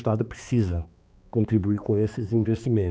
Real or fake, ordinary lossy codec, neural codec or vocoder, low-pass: fake; none; codec, 16 kHz, 2 kbps, X-Codec, HuBERT features, trained on balanced general audio; none